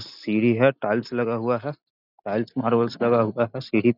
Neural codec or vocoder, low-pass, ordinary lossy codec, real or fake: none; 5.4 kHz; none; real